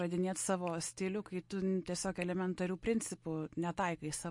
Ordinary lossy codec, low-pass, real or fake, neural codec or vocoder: MP3, 48 kbps; 10.8 kHz; real; none